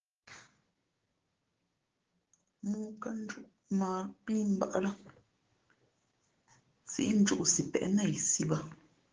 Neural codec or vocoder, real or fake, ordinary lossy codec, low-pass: codec, 16 kHz, 6 kbps, DAC; fake; Opus, 16 kbps; 7.2 kHz